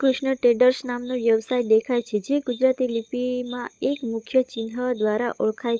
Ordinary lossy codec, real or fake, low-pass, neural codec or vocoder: none; fake; none; codec, 16 kHz, 16 kbps, FunCodec, trained on LibriTTS, 50 frames a second